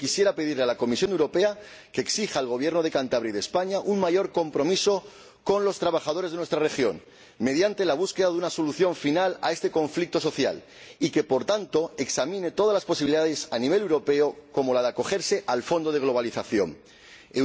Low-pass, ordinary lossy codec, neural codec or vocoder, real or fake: none; none; none; real